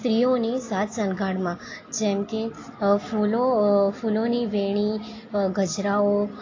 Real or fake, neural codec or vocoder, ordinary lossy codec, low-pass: real; none; AAC, 32 kbps; 7.2 kHz